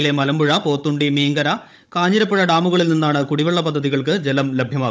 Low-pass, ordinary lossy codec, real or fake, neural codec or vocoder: none; none; fake; codec, 16 kHz, 16 kbps, FunCodec, trained on Chinese and English, 50 frames a second